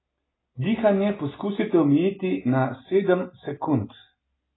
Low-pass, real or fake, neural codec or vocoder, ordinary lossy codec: 7.2 kHz; real; none; AAC, 16 kbps